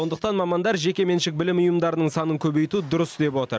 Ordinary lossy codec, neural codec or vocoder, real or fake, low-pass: none; none; real; none